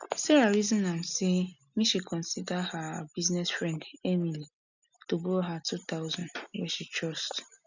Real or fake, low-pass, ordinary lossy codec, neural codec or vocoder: real; 7.2 kHz; none; none